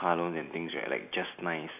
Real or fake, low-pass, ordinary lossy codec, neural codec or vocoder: real; 3.6 kHz; none; none